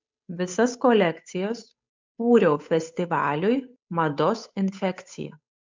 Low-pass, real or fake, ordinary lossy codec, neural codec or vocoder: 7.2 kHz; fake; MP3, 64 kbps; codec, 16 kHz, 8 kbps, FunCodec, trained on Chinese and English, 25 frames a second